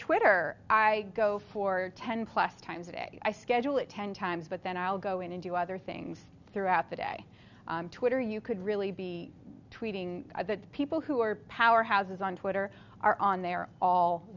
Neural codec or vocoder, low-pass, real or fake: none; 7.2 kHz; real